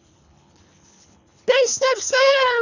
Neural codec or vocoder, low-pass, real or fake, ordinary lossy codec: codec, 24 kHz, 3 kbps, HILCodec; 7.2 kHz; fake; none